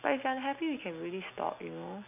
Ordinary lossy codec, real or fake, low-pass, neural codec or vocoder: AAC, 32 kbps; real; 3.6 kHz; none